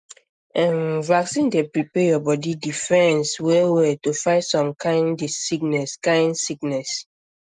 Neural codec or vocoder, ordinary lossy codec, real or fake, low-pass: none; none; real; 9.9 kHz